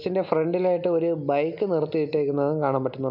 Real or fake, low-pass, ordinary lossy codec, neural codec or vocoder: real; 5.4 kHz; none; none